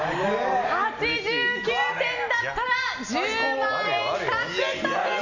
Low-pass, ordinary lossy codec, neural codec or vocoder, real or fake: 7.2 kHz; none; none; real